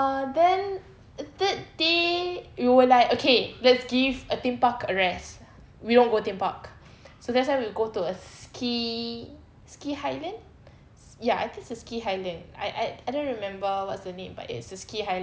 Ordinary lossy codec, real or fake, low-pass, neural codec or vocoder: none; real; none; none